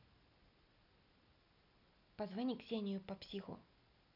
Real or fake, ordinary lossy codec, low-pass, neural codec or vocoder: real; none; 5.4 kHz; none